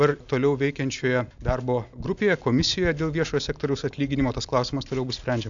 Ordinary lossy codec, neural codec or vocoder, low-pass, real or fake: AAC, 64 kbps; none; 7.2 kHz; real